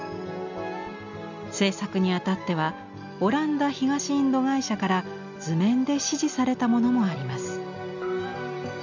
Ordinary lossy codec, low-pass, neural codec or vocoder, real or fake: none; 7.2 kHz; none; real